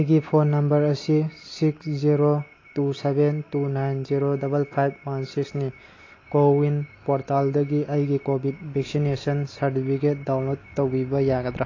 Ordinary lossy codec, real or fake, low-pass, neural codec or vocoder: AAC, 32 kbps; real; 7.2 kHz; none